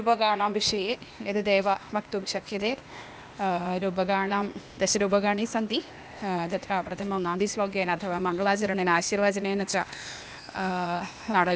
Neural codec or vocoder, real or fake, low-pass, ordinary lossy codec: codec, 16 kHz, 0.8 kbps, ZipCodec; fake; none; none